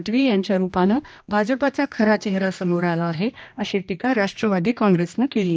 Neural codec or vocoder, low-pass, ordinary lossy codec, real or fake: codec, 16 kHz, 1 kbps, X-Codec, HuBERT features, trained on general audio; none; none; fake